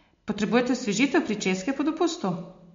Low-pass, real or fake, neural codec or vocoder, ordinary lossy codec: 7.2 kHz; real; none; MP3, 48 kbps